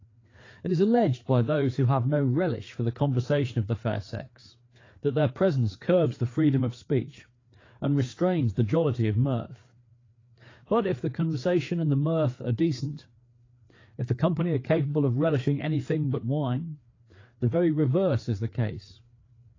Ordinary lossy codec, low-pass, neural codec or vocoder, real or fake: AAC, 32 kbps; 7.2 kHz; codec, 16 kHz, 4 kbps, FreqCodec, larger model; fake